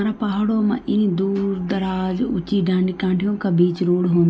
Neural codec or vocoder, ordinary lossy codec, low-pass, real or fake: none; none; none; real